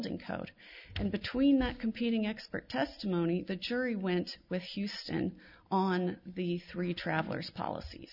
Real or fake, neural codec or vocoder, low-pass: real; none; 5.4 kHz